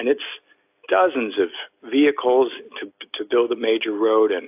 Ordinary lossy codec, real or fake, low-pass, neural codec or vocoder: AAC, 32 kbps; real; 3.6 kHz; none